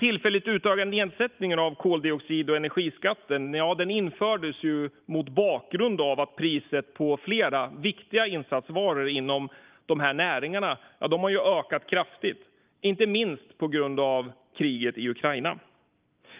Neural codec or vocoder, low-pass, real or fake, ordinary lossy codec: none; 3.6 kHz; real; Opus, 24 kbps